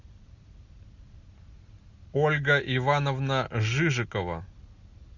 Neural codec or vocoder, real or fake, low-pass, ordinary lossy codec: none; real; 7.2 kHz; Opus, 64 kbps